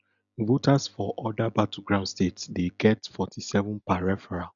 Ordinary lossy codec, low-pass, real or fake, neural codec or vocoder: none; 7.2 kHz; real; none